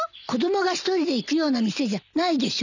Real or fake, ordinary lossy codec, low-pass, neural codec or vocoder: real; none; 7.2 kHz; none